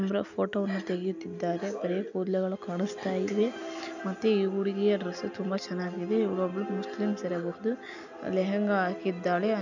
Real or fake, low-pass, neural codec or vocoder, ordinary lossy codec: real; 7.2 kHz; none; none